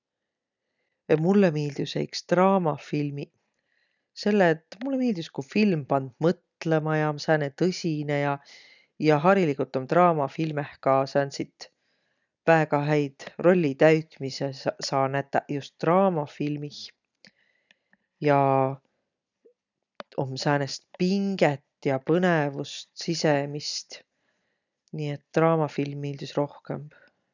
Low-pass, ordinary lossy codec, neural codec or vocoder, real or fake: 7.2 kHz; none; none; real